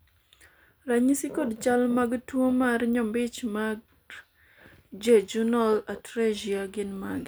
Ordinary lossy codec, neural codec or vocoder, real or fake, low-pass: none; none; real; none